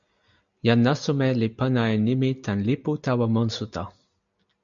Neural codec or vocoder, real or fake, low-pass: none; real; 7.2 kHz